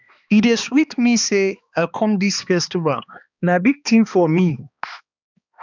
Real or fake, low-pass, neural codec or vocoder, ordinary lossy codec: fake; 7.2 kHz; codec, 16 kHz, 2 kbps, X-Codec, HuBERT features, trained on balanced general audio; none